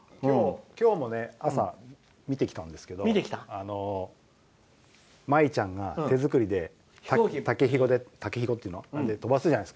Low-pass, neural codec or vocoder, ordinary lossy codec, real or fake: none; none; none; real